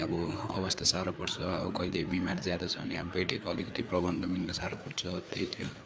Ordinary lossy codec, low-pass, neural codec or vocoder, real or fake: none; none; codec, 16 kHz, 4 kbps, FreqCodec, larger model; fake